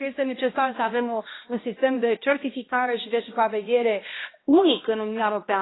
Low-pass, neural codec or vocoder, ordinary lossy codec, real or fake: 7.2 kHz; codec, 16 kHz, 1 kbps, X-Codec, HuBERT features, trained on balanced general audio; AAC, 16 kbps; fake